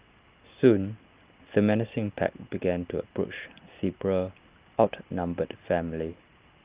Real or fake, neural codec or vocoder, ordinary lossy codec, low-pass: real; none; Opus, 32 kbps; 3.6 kHz